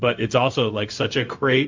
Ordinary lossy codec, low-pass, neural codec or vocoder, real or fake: MP3, 48 kbps; 7.2 kHz; codec, 16 kHz, 0.4 kbps, LongCat-Audio-Codec; fake